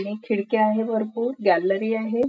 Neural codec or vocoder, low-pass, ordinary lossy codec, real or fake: none; none; none; real